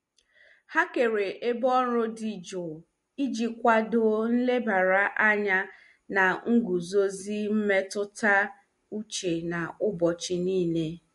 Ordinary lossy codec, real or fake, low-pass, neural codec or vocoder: MP3, 48 kbps; real; 14.4 kHz; none